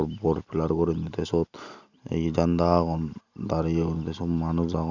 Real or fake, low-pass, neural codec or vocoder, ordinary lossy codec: real; 7.2 kHz; none; none